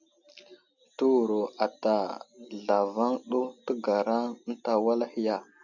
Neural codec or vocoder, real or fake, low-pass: none; real; 7.2 kHz